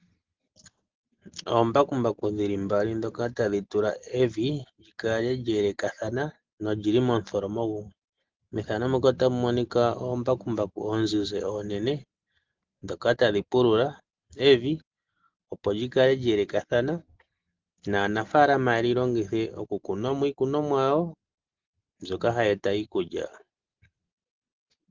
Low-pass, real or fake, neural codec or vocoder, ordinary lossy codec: 7.2 kHz; real; none; Opus, 16 kbps